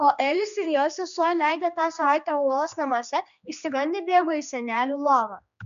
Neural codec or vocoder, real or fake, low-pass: codec, 16 kHz, 2 kbps, X-Codec, HuBERT features, trained on general audio; fake; 7.2 kHz